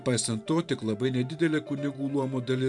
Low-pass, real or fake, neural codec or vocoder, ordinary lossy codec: 10.8 kHz; fake; vocoder, 44.1 kHz, 128 mel bands every 512 samples, BigVGAN v2; MP3, 96 kbps